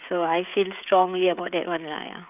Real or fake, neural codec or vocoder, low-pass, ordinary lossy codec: fake; codec, 16 kHz, 16 kbps, FreqCodec, smaller model; 3.6 kHz; none